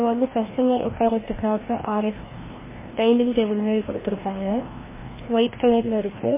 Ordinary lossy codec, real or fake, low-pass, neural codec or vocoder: MP3, 16 kbps; fake; 3.6 kHz; codec, 16 kHz, 1 kbps, FreqCodec, larger model